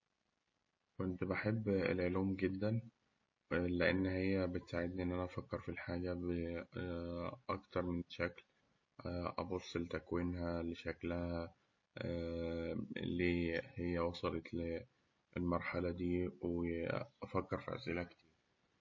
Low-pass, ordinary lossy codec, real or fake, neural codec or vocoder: 7.2 kHz; MP3, 32 kbps; real; none